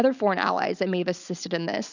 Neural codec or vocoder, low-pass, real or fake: none; 7.2 kHz; real